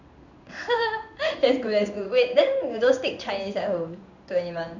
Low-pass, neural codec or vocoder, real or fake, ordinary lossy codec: 7.2 kHz; codec, 16 kHz in and 24 kHz out, 1 kbps, XY-Tokenizer; fake; none